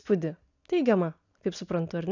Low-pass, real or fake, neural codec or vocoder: 7.2 kHz; real; none